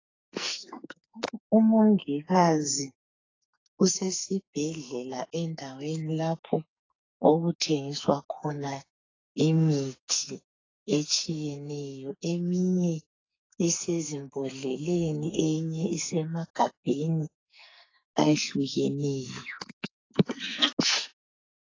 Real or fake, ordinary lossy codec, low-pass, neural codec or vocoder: fake; AAC, 32 kbps; 7.2 kHz; codec, 44.1 kHz, 2.6 kbps, SNAC